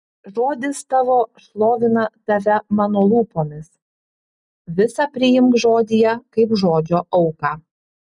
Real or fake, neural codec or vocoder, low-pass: real; none; 10.8 kHz